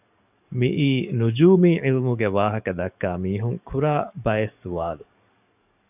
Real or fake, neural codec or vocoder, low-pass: fake; codec, 44.1 kHz, 7.8 kbps, DAC; 3.6 kHz